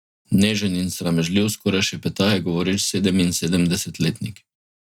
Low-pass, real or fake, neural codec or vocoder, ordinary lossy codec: 19.8 kHz; real; none; none